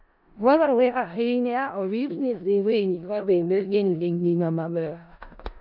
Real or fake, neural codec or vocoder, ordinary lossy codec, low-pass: fake; codec, 16 kHz in and 24 kHz out, 0.4 kbps, LongCat-Audio-Codec, four codebook decoder; none; 5.4 kHz